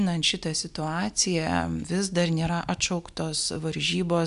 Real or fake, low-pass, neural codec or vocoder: real; 10.8 kHz; none